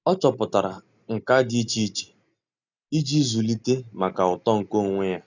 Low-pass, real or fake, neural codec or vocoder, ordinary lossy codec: 7.2 kHz; real; none; none